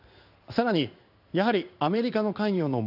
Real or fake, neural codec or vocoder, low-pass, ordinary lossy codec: fake; codec, 16 kHz in and 24 kHz out, 1 kbps, XY-Tokenizer; 5.4 kHz; AAC, 48 kbps